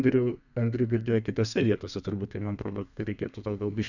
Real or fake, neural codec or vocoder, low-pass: fake; codec, 32 kHz, 1.9 kbps, SNAC; 7.2 kHz